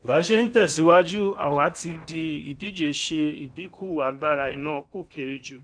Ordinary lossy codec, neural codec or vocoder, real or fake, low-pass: none; codec, 16 kHz in and 24 kHz out, 0.8 kbps, FocalCodec, streaming, 65536 codes; fake; 9.9 kHz